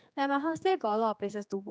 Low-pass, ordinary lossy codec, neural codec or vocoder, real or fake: none; none; codec, 16 kHz, 2 kbps, X-Codec, HuBERT features, trained on general audio; fake